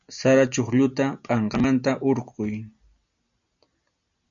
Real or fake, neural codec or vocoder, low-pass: real; none; 7.2 kHz